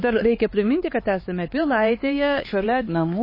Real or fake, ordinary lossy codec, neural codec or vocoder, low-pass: fake; MP3, 24 kbps; codec, 16 kHz, 4 kbps, X-Codec, HuBERT features, trained on LibriSpeech; 5.4 kHz